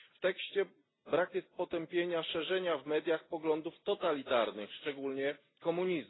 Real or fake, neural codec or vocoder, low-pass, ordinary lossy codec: real; none; 7.2 kHz; AAC, 16 kbps